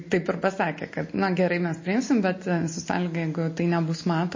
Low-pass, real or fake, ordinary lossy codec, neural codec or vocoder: 7.2 kHz; real; MP3, 32 kbps; none